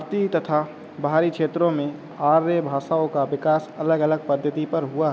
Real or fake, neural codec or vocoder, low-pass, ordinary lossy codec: real; none; none; none